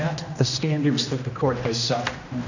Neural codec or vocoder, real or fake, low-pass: codec, 16 kHz, 1 kbps, X-Codec, HuBERT features, trained on general audio; fake; 7.2 kHz